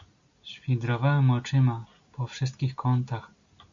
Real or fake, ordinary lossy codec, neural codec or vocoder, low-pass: real; AAC, 64 kbps; none; 7.2 kHz